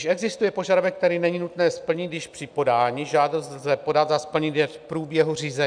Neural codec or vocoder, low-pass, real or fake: none; 9.9 kHz; real